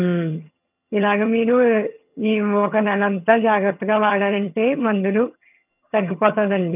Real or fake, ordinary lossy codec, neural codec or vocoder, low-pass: fake; none; vocoder, 22.05 kHz, 80 mel bands, HiFi-GAN; 3.6 kHz